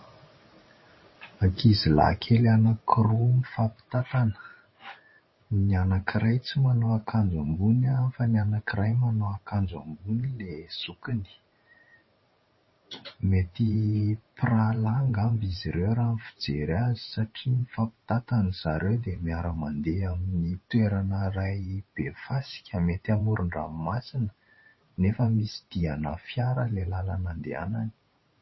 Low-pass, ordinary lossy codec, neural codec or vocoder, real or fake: 7.2 kHz; MP3, 24 kbps; vocoder, 44.1 kHz, 128 mel bands every 512 samples, BigVGAN v2; fake